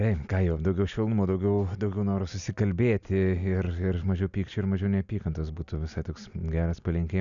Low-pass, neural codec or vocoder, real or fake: 7.2 kHz; none; real